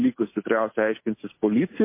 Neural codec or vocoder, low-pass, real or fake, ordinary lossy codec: none; 3.6 kHz; real; MP3, 24 kbps